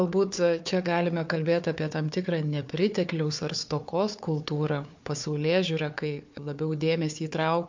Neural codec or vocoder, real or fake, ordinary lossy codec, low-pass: codec, 16 kHz, 4 kbps, FunCodec, trained on Chinese and English, 50 frames a second; fake; MP3, 48 kbps; 7.2 kHz